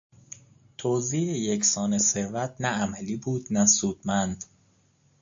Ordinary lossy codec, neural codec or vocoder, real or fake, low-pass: AAC, 64 kbps; none; real; 7.2 kHz